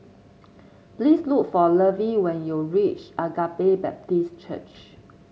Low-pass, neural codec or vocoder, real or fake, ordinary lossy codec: none; none; real; none